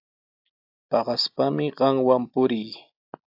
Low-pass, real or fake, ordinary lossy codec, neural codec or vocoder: 5.4 kHz; real; AAC, 48 kbps; none